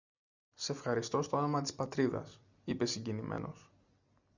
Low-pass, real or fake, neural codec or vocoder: 7.2 kHz; real; none